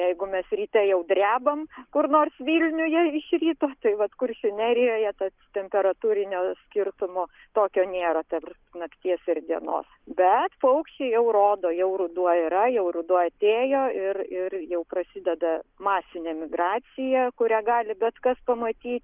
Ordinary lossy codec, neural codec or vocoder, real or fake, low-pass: Opus, 24 kbps; none; real; 3.6 kHz